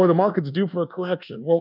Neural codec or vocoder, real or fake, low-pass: codec, 24 kHz, 1.2 kbps, DualCodec; fake; 5.4 kHz